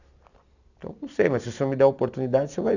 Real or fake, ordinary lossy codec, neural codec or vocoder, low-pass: real; none; none; 7.2 kHz